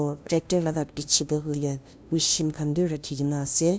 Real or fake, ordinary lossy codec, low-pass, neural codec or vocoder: fake; none; none; codec, 16 kHz, 0.5 kbps, FunCodec, trained on LibriTTS, 25 frames a second